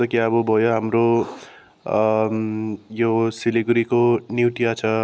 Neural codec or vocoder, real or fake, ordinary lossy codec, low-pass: none; real; none; none